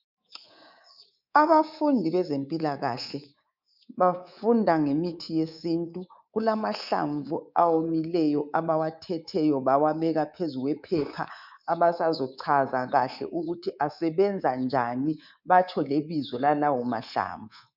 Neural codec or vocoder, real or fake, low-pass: codec, 24 kHz, 3.1 kbps, DualCodec; fake; 5.4 kHz